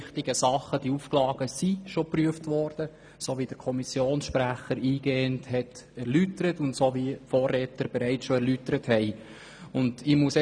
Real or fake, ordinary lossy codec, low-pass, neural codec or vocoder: real; none; 9.9 kHz; none